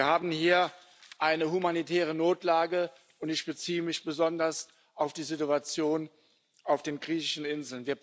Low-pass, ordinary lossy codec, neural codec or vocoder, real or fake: none; none; none; real